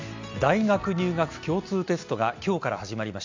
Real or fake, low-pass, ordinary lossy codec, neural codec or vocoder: real; 7.2 kHz; MP3, 64 kbps; none